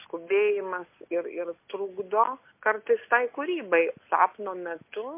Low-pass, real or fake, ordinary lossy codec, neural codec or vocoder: 3.6 kHz; real; MP3, 24 kbps; none